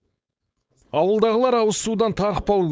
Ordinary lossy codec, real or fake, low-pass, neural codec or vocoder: none; fake; none; codec, 16 kHz, 4.8 kbps, FACodec